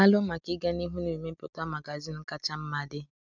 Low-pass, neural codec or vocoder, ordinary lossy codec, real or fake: 7.2 kHz; none; none; real